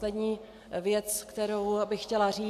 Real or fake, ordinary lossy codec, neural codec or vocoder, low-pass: real; MP3, 96 kbps; none; 14.4 kHz